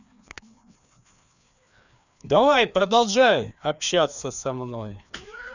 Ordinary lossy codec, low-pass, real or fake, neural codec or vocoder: none; 7.2 kHz; fake; codec, 16 kHz, 2 kbps, FreqCodec, larger model